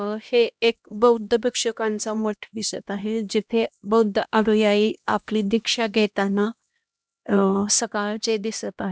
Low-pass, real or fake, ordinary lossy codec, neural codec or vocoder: none; fake; none; codec, 16 kHz, 1 kbps, X-Codec, HuBERT features, trained on LibriSpeech